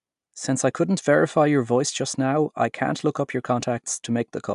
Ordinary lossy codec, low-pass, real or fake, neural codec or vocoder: AAC, 96 kbps; 10.8 kHz; real; none